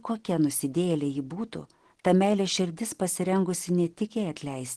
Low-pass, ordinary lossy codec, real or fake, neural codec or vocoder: 10.8 kHz; Opus, 16 kbps; real; none